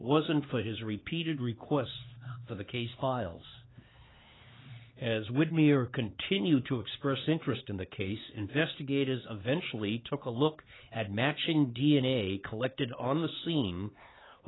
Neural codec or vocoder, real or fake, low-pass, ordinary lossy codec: codec, 16 kHz, 4 kbps, X-Codec, HuBERT features, trained on LibriSpeech; fake; 7.2 kHz; AAC, 16 kbps